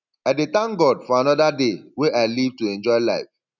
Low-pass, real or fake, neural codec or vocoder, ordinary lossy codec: 7.2 kHz; real; none; none